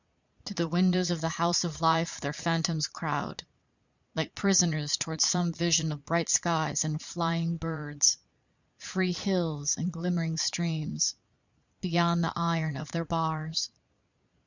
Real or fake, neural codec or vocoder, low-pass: fake; vocoder, 22.05 kHz, 80 mel bands, Vocos; 7.2 kHz